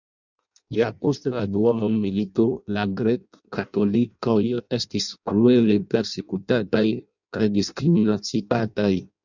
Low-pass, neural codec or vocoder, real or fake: 7.2 kHz; codec, 16 kHz in and 24 kHz out, 0.6 kbps, FireRedTTS-2 codec; fake